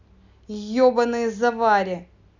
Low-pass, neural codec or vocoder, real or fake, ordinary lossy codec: 7.2 kHz; none; real; none